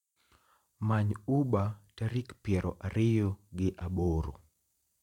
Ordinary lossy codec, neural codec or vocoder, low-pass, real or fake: none; vocoder, 44.1 kHz, 128 mel bands every 512 samples, BigVGAN v2; 19.8 kHz; fake